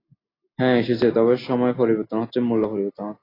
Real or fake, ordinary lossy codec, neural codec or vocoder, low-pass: real; AAC, 24 kbps; none; 5.4 kHz